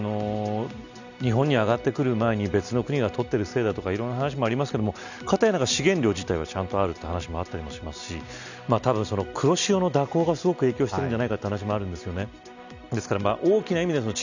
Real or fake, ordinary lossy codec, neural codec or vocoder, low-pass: real; none; none; 7.2 kHz